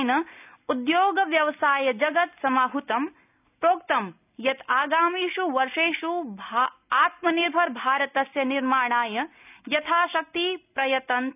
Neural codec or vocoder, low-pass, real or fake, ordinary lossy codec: none; 3.6 kHz; real; none